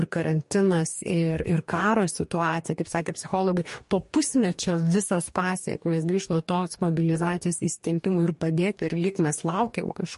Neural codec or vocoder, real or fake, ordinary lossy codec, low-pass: codec, 44.1 kHz, 2.6 kbps, DAC; fake; MP3, 48 kbps; 14.4 kHz